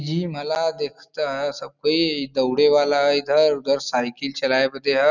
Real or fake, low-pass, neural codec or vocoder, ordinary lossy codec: real; 7.2 kHz; none; none